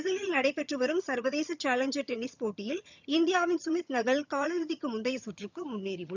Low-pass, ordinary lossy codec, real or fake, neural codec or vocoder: 7.2 kHz; none; fake; vocoder, 22.05 kHz, 80 mel bands, HiFi-GAN